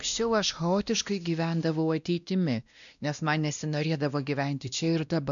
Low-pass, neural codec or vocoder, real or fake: 7.2 kHz; codec, 16 kHz, 1 kbps, X-Codec, WavLM features, trained on Multilingual LibriSpeech; fake